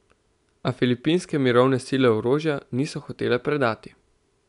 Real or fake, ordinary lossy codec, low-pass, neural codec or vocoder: real; none; 10.8 kHz; none